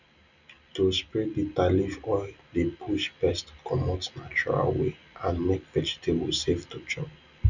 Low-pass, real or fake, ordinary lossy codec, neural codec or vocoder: 7.2 kHz; real; none; none